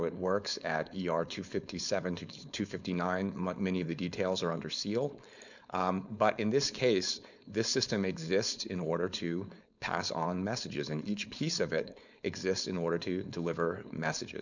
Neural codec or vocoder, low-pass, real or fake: codec, 16 kHz, 4.8 kbps, FACodec; 7.2 kHz; fake